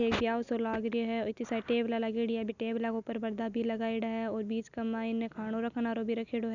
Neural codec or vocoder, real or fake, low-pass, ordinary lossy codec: none; real; 7.2 kHz; none